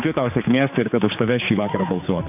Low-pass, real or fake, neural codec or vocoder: 3.6 kHz; fake; codec, 16 kHz, 4 kbps, X-Codec, HuBERT features, trained on general audio